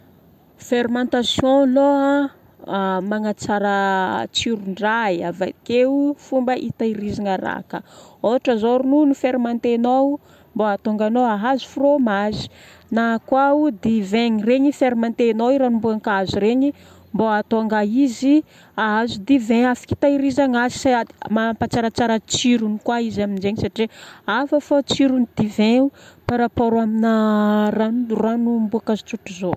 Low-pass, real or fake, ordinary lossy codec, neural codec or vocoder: 14.4 kHz; real; AAC, 96 kbps; none